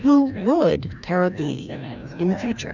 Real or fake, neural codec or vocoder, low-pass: fake; codec, 16 kHz, 1 kbps, FreqCodec, larger model; 7.2 kHz